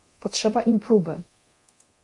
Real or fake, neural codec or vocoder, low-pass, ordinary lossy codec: fake; codec, 24 kHz, 0.9 kbps, DualCodec; 10.8 kHz; MP3, 48 kbps